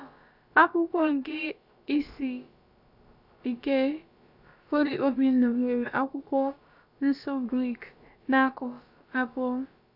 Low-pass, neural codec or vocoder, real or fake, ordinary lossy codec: 5.4 kHz; codec, 16 kHz, about 1 kbps, DyCAST, with the encoder's durations; fake; none